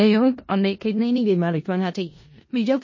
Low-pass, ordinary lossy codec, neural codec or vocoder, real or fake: 7.2 kHz; MP3, 32 kbps; codec, 16 kHz in and 24 kHz out, 0.4 kbps, LongCat-Audio-Codec, four codebook decoder; fake